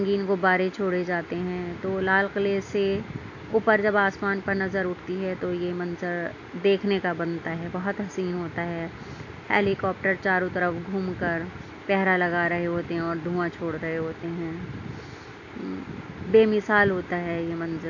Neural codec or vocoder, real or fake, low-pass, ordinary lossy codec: none; real; 7.2 kHz; AAC, 48 kbps